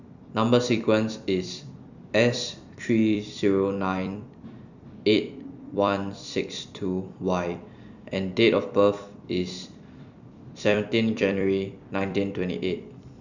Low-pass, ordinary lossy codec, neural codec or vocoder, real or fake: 7.2 kHz; none; vocoder, 44.1 kHz, 128 mel bands every 256 samples, BigVGAN v2; fake